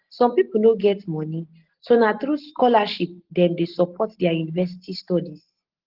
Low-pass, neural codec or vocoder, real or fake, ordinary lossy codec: 5.4 kHz; none; real; Opus, 16 kbps